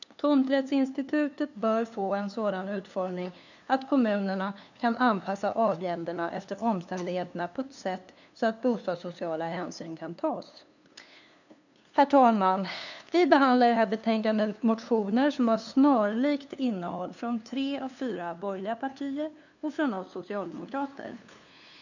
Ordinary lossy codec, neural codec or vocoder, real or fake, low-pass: none; codec, 16 kHz, 2 kbps, FunCodec, trained on LibriTTS, 25 frames a second; fake; 7.2 kHz